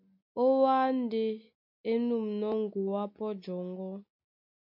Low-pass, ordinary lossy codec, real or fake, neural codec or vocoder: 5.4 kHz; MP3, 48 kbps; real; none